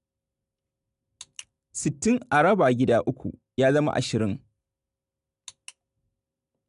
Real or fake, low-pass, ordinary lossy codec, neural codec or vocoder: real; 10.8 kHz; none; none